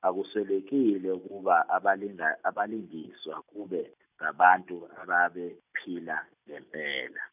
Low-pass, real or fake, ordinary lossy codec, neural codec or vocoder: 3.6 kHz; real; none; none